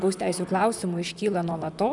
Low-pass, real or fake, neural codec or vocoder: 10.8 kHz; fake; vocoder, 44.1 kHz, 128 mel bands, Pupu-Vocoder